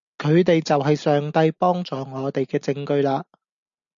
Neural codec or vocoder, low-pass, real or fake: none; 7.2 kHz; real